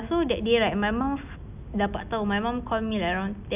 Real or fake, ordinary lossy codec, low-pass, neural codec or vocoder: real; none; 3.6 kHz; none